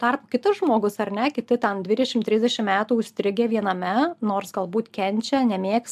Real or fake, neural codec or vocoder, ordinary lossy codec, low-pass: real; none; AAC, 96 kbps; 14.4 kHz